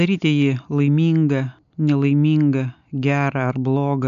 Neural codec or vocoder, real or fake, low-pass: none; real; 7.2 kHz